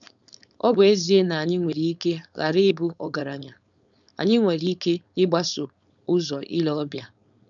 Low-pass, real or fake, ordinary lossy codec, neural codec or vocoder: 7.2 kHz; fake; none; codec, 16 kHz, 4.8 kbps, FACodec